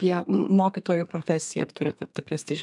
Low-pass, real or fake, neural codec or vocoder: 10.8 kHz; fake; codec, 32 kHz, 1.9 kbps, SNAC